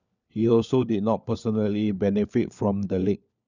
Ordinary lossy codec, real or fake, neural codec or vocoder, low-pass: none; fake; codec, 16 kHz, 4 kbps, FunCodec, trained on LibriTTS, 50 frames a second; 7.2 kHz